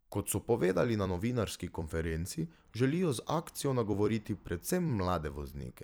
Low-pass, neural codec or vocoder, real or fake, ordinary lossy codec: none; vocoder, 44.1 kHz, 128 mel bands every 256 samples, BigVGAN v2; fake; none